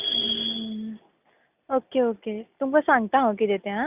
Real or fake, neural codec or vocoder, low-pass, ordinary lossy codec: real; none; 3.6 kHz; Opus, 64 kbps